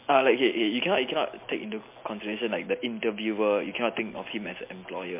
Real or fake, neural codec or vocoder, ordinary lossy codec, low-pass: real; none; MP3, 24 kbps; 3.6 kHz